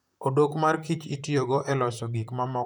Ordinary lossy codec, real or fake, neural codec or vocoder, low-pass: none; fake; vocoder, 44.1 kHz, 128 mel bands, Pupu-Vocoder; none